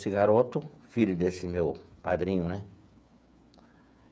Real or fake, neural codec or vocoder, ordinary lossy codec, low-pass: fake; codec, 16 kHz, 8 kbps, FreqCodec, smaller model; none; none